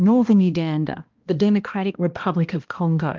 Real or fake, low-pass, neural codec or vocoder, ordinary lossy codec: fake; 7.2 kHz; codec, 16 kHz, 1 kbps, X-Codec, HuBERT features, trained on balanced general audio; Opus, 32 kbps